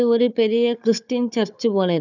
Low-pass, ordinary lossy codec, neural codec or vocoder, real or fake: 7.2 kHz; none; codec, 16 kHz, 4 kbps, FunCodec, trained on Chinese and English, 50 frames a second; fake